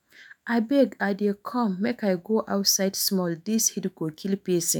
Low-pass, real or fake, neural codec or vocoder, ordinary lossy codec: none; fake; autoencoder, 48 kHz, 128 numbers a frame, DAC-VAE, trained on Japanese speech; none